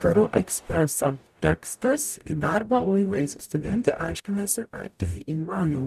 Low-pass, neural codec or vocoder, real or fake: 14.4 kHz; codec, 44.1 kHz, 0.9 kbps, DAC; fake